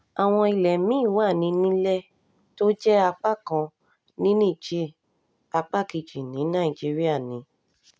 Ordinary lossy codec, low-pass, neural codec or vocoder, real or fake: none; none; none; real